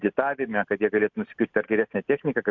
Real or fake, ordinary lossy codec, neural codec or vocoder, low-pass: real; MP3, 64 kbps; none; 7.2 kHz